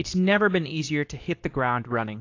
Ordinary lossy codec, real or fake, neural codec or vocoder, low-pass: AAC, 48 kbps; fake; codec, 24 kHz, 0.9 kbps, WavTokenizer, medium speech release version 2; 7.2 kHz